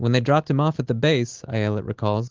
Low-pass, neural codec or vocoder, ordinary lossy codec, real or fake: 7.2 kHz; none; Opus, 16 kbps; real